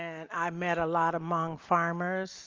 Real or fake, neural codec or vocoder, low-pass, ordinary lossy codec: real; none; 7.2 kHz; Opus, 32 kbps